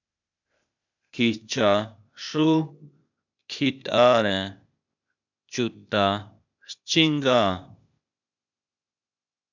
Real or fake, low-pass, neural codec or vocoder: fake; 7.2 kHz; codec, 16 kHz, 0.8 kbps, ZipCodec